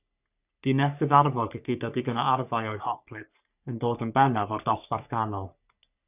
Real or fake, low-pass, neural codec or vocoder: fake; 3.6 kHz; codec, 44.1 kHz, 3.4 kbps, Pupu-Codec